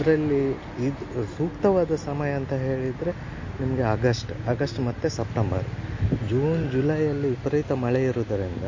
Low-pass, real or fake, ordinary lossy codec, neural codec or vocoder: 7.2 kHz; real; MP3, 32 kbps; none